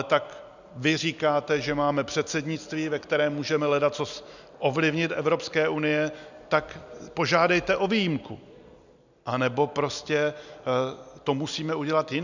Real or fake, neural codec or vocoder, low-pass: real; none; 7.2 kHz